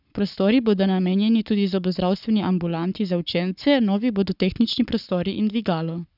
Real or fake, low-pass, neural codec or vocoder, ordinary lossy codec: fake; 5.4 kHz; codec, 44.1 kHz, 7.8 kbps, Pupu-Codec; none